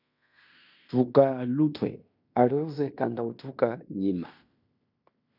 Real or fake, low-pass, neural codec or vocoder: fake; 5.4 kHz; codec, 16 kHz in and 24 kHz out, 0.9 kbps, LongCat-Audio-Codec, fine tuned four codebook decoder